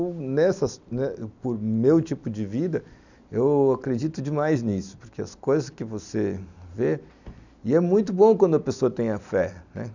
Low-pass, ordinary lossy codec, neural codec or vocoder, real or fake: 7.2 kHz; none; none; real